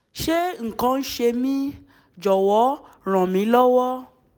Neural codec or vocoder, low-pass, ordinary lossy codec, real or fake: none; none; none; real